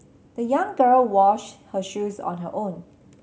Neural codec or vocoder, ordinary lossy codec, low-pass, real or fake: none; none; none; real